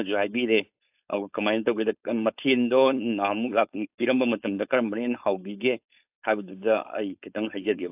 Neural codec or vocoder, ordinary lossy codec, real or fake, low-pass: codec, 16 kHz, 4.8 kbps, FACodec; AAC, 32 kbps; fake; 3.6 kHz